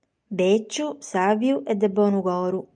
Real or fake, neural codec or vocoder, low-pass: real; none; 9.9 kHz